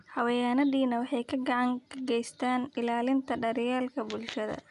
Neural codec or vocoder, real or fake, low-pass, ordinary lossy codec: none; real; 10.8 kHz; none